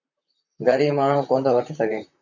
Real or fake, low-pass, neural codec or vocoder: fake; 7.2 kHz; vocoder, 44.1 kHz, 128 mel bands, Pupu-Vocoder